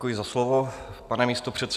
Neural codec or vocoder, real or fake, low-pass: none; real; 14.4 kHz